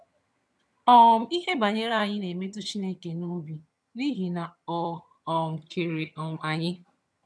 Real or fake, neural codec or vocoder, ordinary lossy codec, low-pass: fake; vocoder, 22.05 kHz, 80 mel bands, HiFi-GAN; none; none